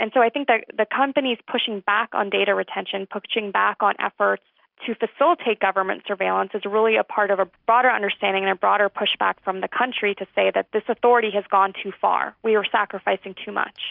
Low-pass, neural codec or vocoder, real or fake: 5.4 kHz; none; real